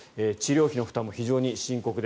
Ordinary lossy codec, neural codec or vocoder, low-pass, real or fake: none; none; none; real